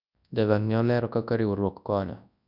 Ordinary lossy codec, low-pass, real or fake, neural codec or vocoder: none; 5.4 kHz; fake; codec, 24 kHz, 0.9 kbps, WavTokenizer, large speech release